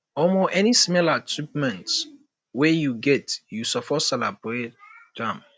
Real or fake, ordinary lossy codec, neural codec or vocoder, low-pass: real; none; none; none